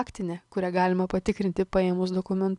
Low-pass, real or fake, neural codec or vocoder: 10.8 kHz; real; none